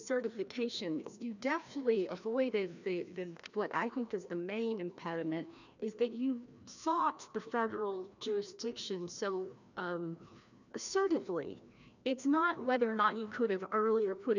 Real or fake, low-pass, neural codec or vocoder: fake; 7.2 kHz; codec, 16 kHz, 1 kbps, FreqCodec, larger model